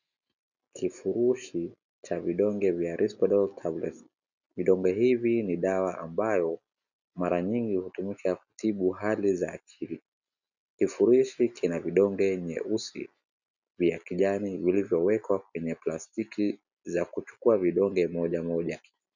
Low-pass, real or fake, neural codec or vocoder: 7.2 kHz; real; none